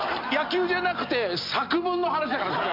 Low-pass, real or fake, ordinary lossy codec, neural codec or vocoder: 5.4 kHz; real; MP3, 48 kbps; none